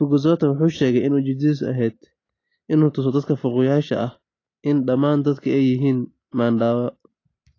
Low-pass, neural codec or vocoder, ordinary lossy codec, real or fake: 7.2 kHz; none; AAC, 32 kbps; real